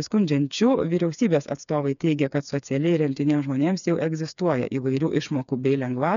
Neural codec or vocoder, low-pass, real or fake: codec, 16 kHz, 4 kbps, FreqCodec, smaller model; 7.2 kHz; fake